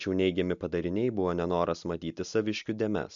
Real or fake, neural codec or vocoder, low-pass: real; none; 7.2 kHz